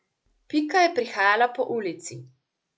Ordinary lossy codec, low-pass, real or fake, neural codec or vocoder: none; none; real; none